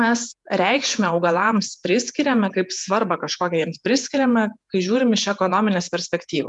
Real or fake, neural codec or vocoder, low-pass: fake; vocoder, 48 kHz, 128 mel bands, Vocos; 10.8 kHz